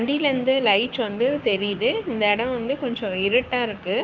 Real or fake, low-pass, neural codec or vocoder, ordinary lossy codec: fake; 7.2 kHz; codec, 16 kHz, 6 kbps, DAC; Opus, 32 kbps